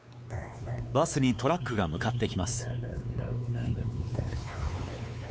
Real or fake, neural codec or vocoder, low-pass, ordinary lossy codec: fake; codec, 16 kHz, 4 kbps, X-Codec, WavLM features, trained on Multilingual LibriSpeech; none; none